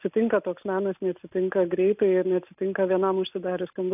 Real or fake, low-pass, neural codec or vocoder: real; 3.6 kHz; none